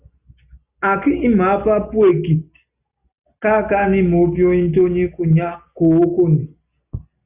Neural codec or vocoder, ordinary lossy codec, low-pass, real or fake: none; Opus, 64 kbps; 3.6 kHz; real